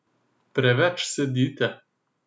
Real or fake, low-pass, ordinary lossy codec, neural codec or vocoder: real; none; none; none